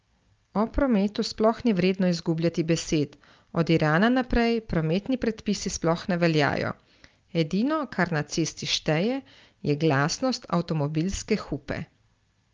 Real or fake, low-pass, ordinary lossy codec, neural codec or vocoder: real; 7.2 kHz; Opus, 24 kbps; none